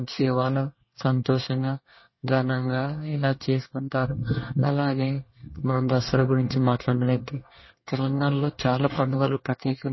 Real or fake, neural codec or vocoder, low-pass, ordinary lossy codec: fake; codec, 24 kHz, 1 kbps, SNAC; 7.2 kHz; MP3, 24 kbps